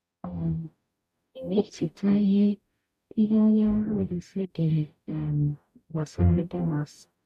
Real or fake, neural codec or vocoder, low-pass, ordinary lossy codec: fake; codec, 44.1 kHz, 0.9 kbps, DAC; 14.4 kHz; none